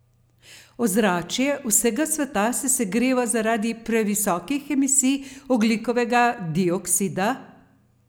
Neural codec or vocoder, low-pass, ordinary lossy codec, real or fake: none; none; none; real